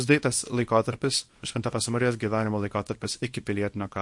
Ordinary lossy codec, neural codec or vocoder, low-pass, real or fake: MP3, 48 kbps; codec, 24 kHz, 0.9 kbps, WavTokenizer, small release; 10.8 kHz; fake